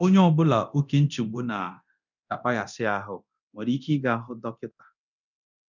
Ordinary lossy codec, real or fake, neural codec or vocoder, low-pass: none; fake; codec, 24 kHz, 0.9 kbps, DualCodec; 7.2 kHz